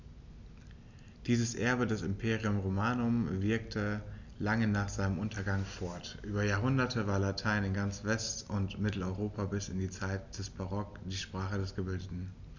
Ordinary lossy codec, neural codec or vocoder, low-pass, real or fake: none; none; 7.2 kHz; real